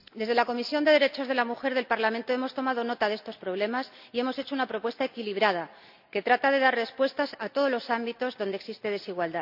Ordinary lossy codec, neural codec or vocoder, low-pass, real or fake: none; none; 5.4 kHz; real